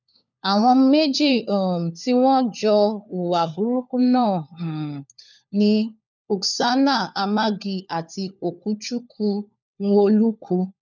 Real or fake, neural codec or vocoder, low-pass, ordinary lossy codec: fake; codec, 16 kHz, 4 kbps, FunCodec, trained on LibriTTS, 50 frames a second; 7.2 kHz; none